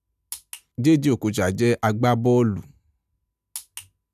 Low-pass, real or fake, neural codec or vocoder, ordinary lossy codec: 14.4 kHz; real; none; none